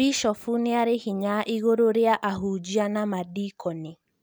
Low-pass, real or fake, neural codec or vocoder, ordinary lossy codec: none; real; none; none